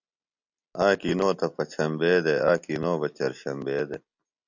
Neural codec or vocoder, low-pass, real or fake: none; 7.2 kHz; real